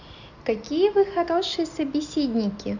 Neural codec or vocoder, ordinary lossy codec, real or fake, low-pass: none; none; real; 7.2 kHz